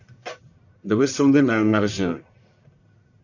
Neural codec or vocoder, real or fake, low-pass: codec, 44.1 kHz, 1.7 kbps, Pupu-Codec; fake; 7.2 kHz